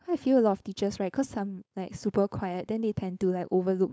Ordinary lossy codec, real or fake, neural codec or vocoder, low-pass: none; fake; codec, 16 kHz, 4.8 kbps, FACodec; none